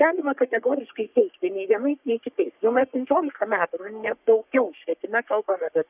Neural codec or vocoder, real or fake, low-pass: codec, 44.1 kHz, 3.4 kbps, Pupu-Codec; fake; 3.6 kHz